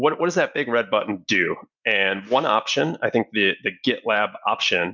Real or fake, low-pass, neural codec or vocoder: real; 7.2 kHz; none